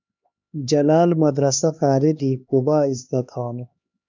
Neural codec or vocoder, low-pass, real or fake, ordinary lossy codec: codec, 16 kHz, 2 kbps, X-Codec, HuBERT features, trained on LibriSpeech; 7.2 kHz; fake; MP3, 64 kbps